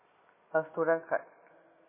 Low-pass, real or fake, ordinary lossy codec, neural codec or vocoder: 3.6 kHz; real; MP3, 16 kbps; none